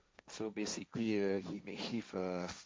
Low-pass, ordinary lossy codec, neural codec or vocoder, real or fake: none; none; codec, 16 kHz, 1.1 kbps, Voila-Tokenizer; fake